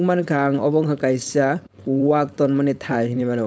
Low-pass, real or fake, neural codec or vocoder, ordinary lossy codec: none; fake; codec, 16 kHz, 4.8 kbps, FACodec; none